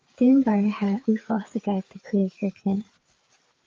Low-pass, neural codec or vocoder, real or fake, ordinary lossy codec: 7.2 kHz; codec, 16 kHz, 4 kbps, FreqCodec, larger model; fake; Opus, 32 kbps